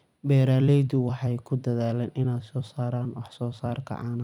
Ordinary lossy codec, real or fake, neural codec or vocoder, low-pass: none; fake; vocoder, 44.1 kHz, 128 mel bands every 256 samples, BigVGAN v2; 19.8 kHz